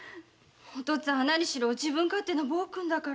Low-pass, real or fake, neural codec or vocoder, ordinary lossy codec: none; real; none; none